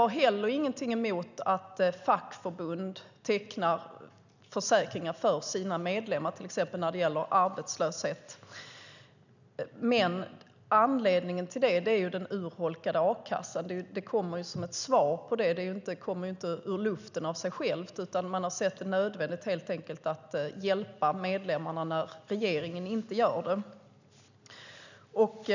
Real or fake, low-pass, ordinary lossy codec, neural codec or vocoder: real; 7.2 kHz; none; none